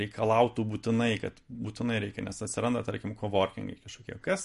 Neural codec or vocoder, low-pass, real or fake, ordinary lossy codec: none; 14.4 kHz; real; MP3, 48 kbps